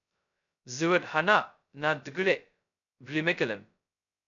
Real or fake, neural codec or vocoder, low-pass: fake; codec, 16 kHz, 0.2 kbps, FocalCodec; 7.2 kHz